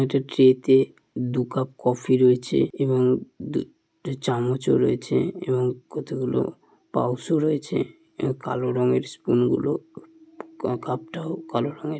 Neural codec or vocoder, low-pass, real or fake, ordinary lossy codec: none; none; real; none